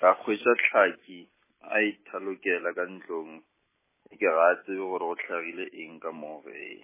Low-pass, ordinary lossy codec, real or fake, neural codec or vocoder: 3.6 kHz; MP3, 16 kbps; real; none